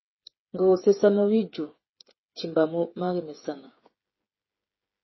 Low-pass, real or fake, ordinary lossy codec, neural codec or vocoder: 7.2 kHz; fake; MP3, 24 kbps; codec, 16 kHz, 8 kbps, FreqCodec, smaller model